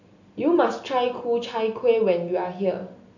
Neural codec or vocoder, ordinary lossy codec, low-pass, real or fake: none; none; 7.2 kHz; real